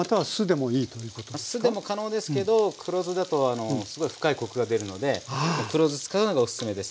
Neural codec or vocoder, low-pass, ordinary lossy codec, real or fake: none; none; none; real